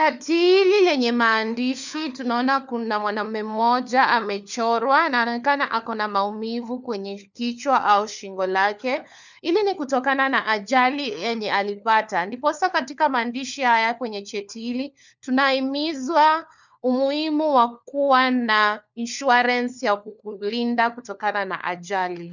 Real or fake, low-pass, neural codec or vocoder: fake; 7.2 kHz; codec, 16 kHz, 4 kbps, FunCodec, trained on LibriTTS, 50 frames a second